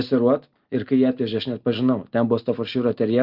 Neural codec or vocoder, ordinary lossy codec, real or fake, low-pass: none; Opus, 24 kbps; real; 5.4 kHz